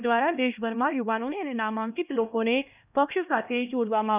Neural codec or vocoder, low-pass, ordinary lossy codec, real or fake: codec, 16 kHz, 1 kbps, X-Codec, HuBERT features, trained on LibriSpeech; 3.6 kHz; none; fake